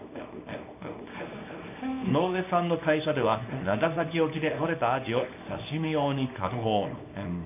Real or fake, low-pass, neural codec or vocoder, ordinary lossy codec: fake; 3.6 kHz; codec, 24 kHz, 0.9 kbps, WavTokenizer, small release; none